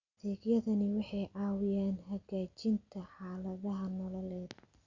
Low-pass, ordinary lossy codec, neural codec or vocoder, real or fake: 7.2 kHz; Opus, 64 kbps; none; real